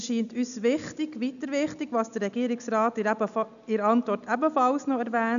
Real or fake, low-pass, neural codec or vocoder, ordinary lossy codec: real; 7.2 kHz; none; none